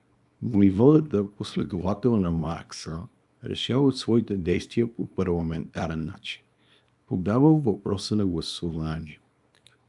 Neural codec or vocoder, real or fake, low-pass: codec, 24 kHz, 0.9 kbps, WavTokenizer, small release; fake; 10.8 kHz